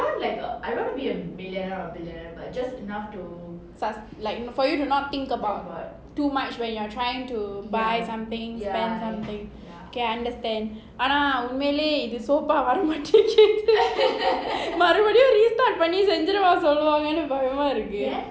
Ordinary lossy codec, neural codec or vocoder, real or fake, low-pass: none; none; real; none